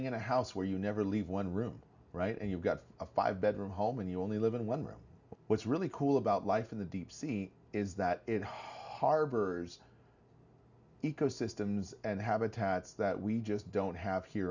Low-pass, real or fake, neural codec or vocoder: 7.2 kHz; real; none